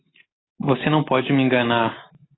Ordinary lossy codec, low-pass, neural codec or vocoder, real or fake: AAC, 16 kbps; 7.2 kHz; none; real